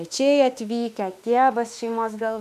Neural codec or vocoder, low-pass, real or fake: autoencoder, 48 kHz, 32 numbers a frame, DAC-VAE, trained on Japanese speech; 14.4 kHz; fake